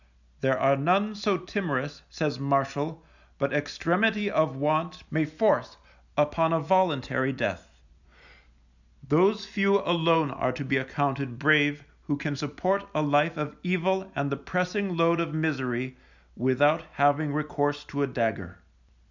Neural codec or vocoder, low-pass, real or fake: none; 7.2 kHz; real